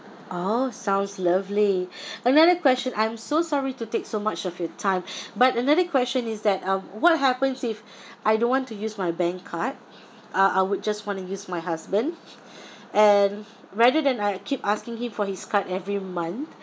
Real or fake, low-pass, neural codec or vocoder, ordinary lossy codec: real; none; none; none